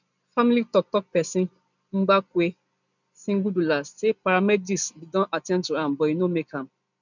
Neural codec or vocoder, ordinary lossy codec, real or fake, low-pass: none; none; real; 7.2 kHz